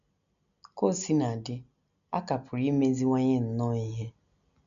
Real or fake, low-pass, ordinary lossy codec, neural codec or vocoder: real; 7.2 kHz; none; none